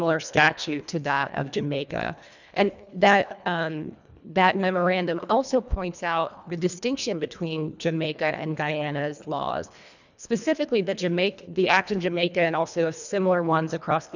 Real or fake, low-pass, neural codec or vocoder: fake; 7.2 kHz; codec, 24 kHz, 1.5 kbps, HILCodec